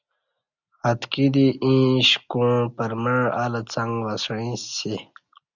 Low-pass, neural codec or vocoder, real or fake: 7.2 kHz; none; real